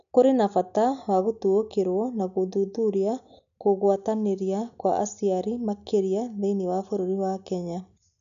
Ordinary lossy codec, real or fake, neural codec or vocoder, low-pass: none; real; none; 7.2 kHz